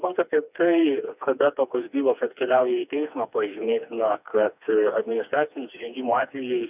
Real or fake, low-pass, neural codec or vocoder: fake; 3.6 kHz; codec, 16 kHz, 2 kbps, FreqCodec, smaller model